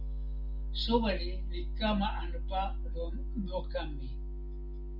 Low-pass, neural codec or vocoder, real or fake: 5.4 kHz; none; real